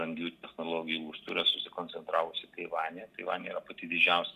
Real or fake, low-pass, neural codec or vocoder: real; 14.4 kHz; none